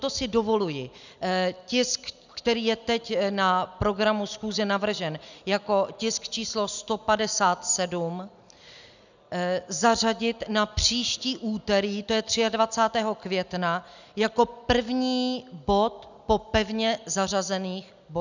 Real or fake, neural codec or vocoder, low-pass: real; none; 7.2 kHz